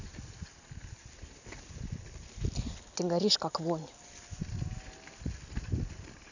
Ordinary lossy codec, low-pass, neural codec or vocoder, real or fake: none; 7.2 kHz; none; real